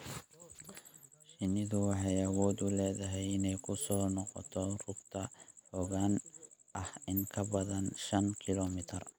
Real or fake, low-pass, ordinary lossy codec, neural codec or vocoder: real; none; none; none